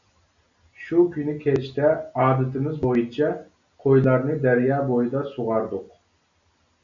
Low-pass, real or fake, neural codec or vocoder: 7.2 kHz; real; none